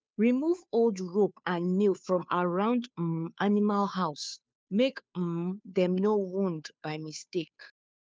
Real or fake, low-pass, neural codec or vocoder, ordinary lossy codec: fake; none; codec, 16 kHz, 2 kbps, FunCodec, trained on Chinese and English, 25 frames a second; none